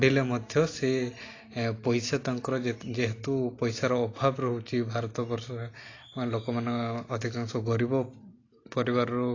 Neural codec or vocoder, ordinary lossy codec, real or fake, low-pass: none; AAC, 32 kbps; real; 7.2 kHz